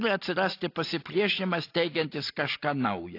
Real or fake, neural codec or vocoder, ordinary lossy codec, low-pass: real; none; AAC, 32 kbps; 5.4 kHz